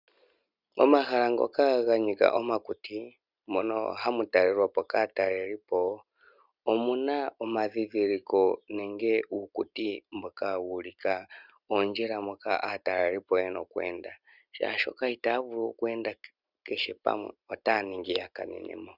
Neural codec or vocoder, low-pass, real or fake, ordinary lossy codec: none; 5.4 kHz; real; Opus, 64 kbps